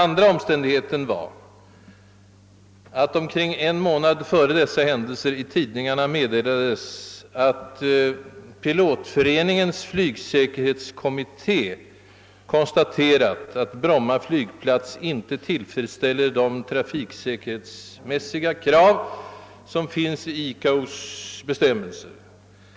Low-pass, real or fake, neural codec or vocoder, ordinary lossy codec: none; real; none; none